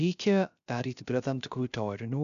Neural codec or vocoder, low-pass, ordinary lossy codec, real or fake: codec, 16 kHz, 0.3 kbps, FocalCodec; 7.2 kHz; MP3, 96 kbps; fake